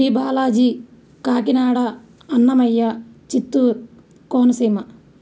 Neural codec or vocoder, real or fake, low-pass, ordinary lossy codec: none; real; none; none